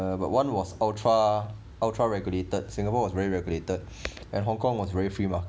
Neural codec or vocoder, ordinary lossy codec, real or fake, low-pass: none; none; real; none